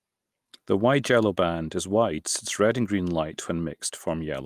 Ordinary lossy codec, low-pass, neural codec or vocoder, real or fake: Opus, 32 kbps; 14.4 kHz; none; real